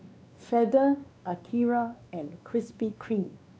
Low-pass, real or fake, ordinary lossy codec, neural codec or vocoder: none; fake; none; codec, 16 kHz, 2 kbps, X-Codec, WavLM features, trained on Multilingual LibriSpeech